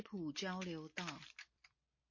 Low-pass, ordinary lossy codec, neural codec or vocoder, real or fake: 7.2 kHz; MP3, 32 kbps; none; real